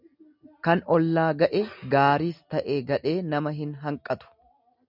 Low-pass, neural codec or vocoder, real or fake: 5.4 kHz; none; real